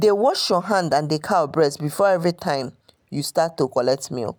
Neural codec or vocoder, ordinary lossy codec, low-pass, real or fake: none; none; none; real